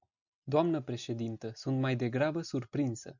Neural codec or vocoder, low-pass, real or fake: none; 7.2 kHz; real